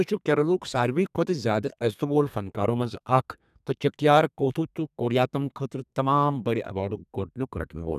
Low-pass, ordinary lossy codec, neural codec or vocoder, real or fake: 14.4 kHz; none; codec, 32 kHz, 1.9 kbps, SNAC; fake